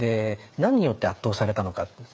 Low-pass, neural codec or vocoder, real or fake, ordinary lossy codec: none; codec, 16 kHz, 8 kbps, FreqCodec, smaller model; fake; none